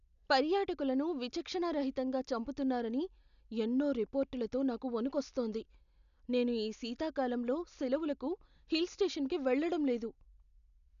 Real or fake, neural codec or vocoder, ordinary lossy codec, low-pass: real; none; none; 7.2 kHz